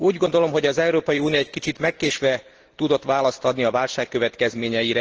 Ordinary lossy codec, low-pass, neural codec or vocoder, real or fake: Opus, 16 kbps; 7.2 kHz; none; real